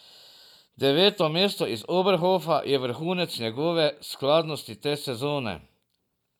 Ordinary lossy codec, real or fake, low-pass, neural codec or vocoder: none; real; 19.8 kHz; none